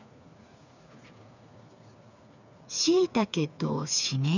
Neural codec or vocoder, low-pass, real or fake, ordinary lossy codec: codec, 16 kHz, 4 kbps, FreqCodec, smaller model; 7.2 kHz; fake; none